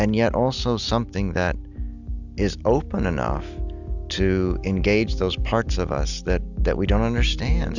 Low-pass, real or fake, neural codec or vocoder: 7.2 kHz; real; none